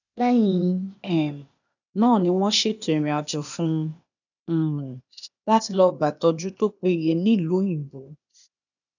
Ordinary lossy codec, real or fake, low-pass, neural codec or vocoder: none; fake; 7.2 kHz; codec, 16 kHz, 0.8 kbps, ZipCodec